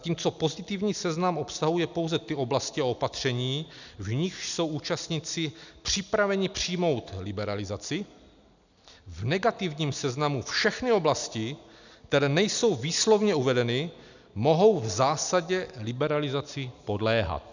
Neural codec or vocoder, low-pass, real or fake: none; 7.2 kHz; real